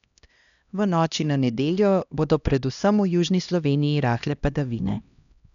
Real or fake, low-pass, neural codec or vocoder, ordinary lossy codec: fake; 7.2 kHz; codec, 16 kHz, 1 kbps, X-Codec, HuBERT features, trained on LibriSpeech; none